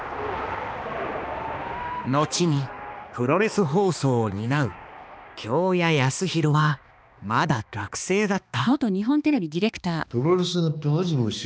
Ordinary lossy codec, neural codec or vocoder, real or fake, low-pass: none; codec, 16 kHz, 2 kbps, X-Codec, HuBERT features, trained on balanced general audio; fake; none